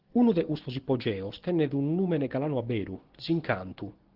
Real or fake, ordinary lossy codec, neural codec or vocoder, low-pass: real; Opus, 16 kbps; none; 5.4 kHz